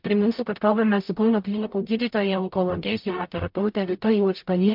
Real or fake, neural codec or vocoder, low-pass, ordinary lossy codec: fake; codec, 44.1 kHz, 0.9 kbps, DAC; 5.4 kHz; MP3, 48 kbps